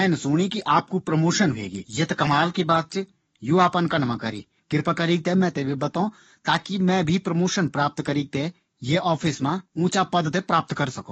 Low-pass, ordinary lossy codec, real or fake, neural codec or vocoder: 19.8 kHz; AAC, 24 kbps; fake; codec, 44.1 kHz, 7.8 kbps, DAC